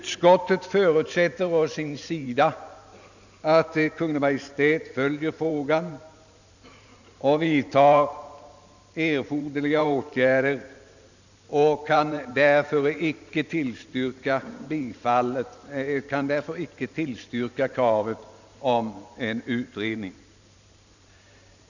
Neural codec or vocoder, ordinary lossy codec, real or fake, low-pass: vocoder, 44.1 kHz, 128 mel bands every 512 samples, BigVGAN v2; none; fake; 7.2 kHz